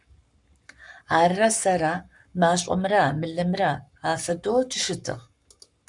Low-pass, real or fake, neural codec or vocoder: 10.8 kHz; fake; codec, 44.1 kHz, 7.8 kbps, Pupu-Codec